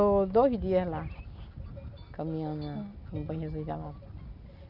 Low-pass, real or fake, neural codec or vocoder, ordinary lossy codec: 5.4 kHz; real; none; none